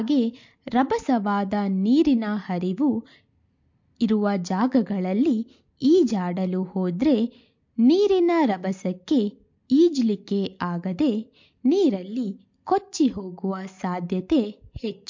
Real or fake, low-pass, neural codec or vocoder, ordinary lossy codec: real; 7.2 kHz; none; MP3, 48 kbps